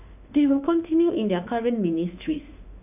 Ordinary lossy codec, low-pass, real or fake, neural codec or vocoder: none; 3.6 kHz; fake; autoencoder, 48 kHz, 32 numbers a frame, DAC-VAE, trained on Japanese speech